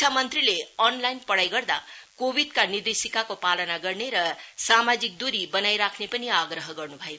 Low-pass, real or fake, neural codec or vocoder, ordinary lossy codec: none; real; none; none